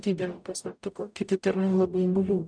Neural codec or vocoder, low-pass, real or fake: codec, 44.1 kHz, 0.9 kbps, DAC; 9.9 kHz; fake